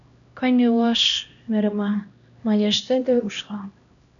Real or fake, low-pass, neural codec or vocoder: fake; 7.2 kHz; codec, 16 kHz, 1 kbps, X-Codec, HuBERT features, trained on LibriSpeech